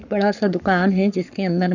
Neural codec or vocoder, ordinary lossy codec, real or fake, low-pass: codec, 44.1 kHz, 7.8 kbps, Pupu-Codec; none; fake; 7.2 kHz